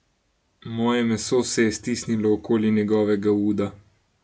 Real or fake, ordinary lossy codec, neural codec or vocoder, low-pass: real; none; none; none